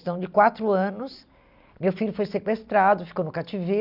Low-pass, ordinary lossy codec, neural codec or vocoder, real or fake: 5.4 kHz; none; none; real